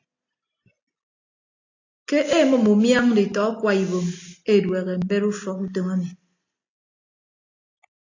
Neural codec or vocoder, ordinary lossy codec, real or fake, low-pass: none; AAC, 48 kbps; real; 7.2 kHz